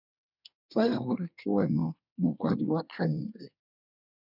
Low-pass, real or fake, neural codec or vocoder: 5.4 kHz; fake; codec, 24 kHz, 1 kbps, SNAC